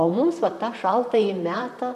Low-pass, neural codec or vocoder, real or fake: 14.4 kHz; vocoder, 44.1 kHz, 128 mel bands, Pupu-Vocoder; fake